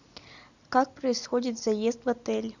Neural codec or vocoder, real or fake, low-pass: none; real; 7.2 kHz